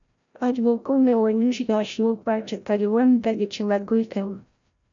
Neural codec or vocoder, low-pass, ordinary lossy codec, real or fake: codec, 16 kHz, 0.5 kbps, FreqCodec, larger model; 7.2 kHz; MP3, 64 kbps; fake